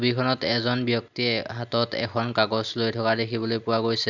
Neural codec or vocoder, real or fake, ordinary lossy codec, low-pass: none; real; none; 7.2 kHz